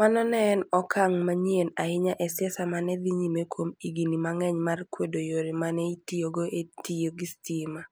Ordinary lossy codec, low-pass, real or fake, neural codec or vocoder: none; none; real; none